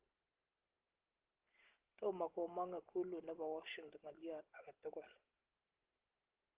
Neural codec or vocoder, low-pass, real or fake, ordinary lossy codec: none; 3.6 kHz; real; Opus, 16 kbps